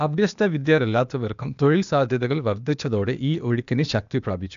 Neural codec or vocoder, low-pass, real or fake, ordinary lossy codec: codec, 16 kHz, 0.8 kbps, ZipCodec; 7.2 kHz; fake; none